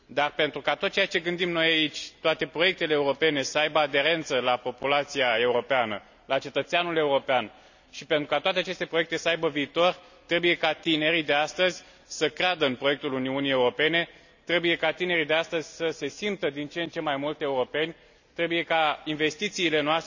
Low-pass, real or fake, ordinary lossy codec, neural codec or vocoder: 7.2 kHz; real; none; none